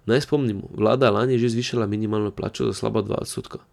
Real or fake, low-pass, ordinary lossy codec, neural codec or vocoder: real; 19.8 kHz; none; none